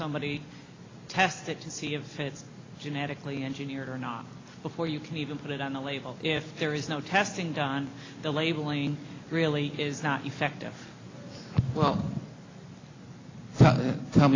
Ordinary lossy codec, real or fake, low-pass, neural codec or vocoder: AAC, 32 kbps; real; 7.2 kHz; none